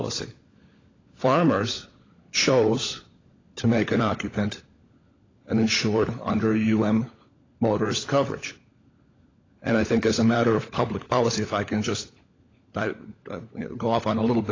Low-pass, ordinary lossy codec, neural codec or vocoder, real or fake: 7.2 kHz; AAC, 32 kbps; codec, 16 kHz, 16 kbps, FunCodec, trained on LibriTTS, 50 frames a second; fake